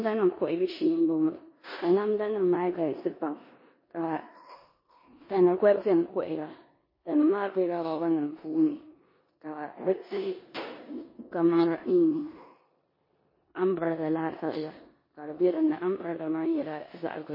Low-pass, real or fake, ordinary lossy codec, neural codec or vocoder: 5.4 kHz; fake; MP3, 24 kbps; codec, 16 kHz in and 24 kHz out, 0.9 kbps, LongCat-Audio-Codec, four codebook decoder